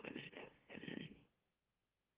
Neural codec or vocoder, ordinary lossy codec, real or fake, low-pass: autoencoder, 44.1 kHz, a latent of 192 numbers a frame, MeloTTS; Opus, 16 kbps; fake; 3.6 kHz